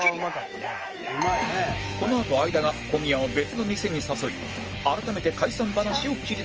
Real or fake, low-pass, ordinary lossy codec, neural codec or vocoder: fake; 7.2 kHz; Opus, 24 kbps; autoencoder, 48 kHz, 128 numbers a frame, DAC-VAE, trained on Japanese speech